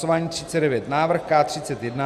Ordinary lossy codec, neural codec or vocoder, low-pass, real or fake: Opus, 64 kbps; none; 14.4 kHz; real